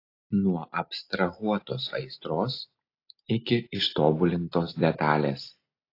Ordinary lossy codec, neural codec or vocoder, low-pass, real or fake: AAC, 32 kbps; none; 5.4 kHz; real